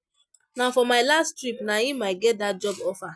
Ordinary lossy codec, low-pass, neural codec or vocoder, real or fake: none; none; none; real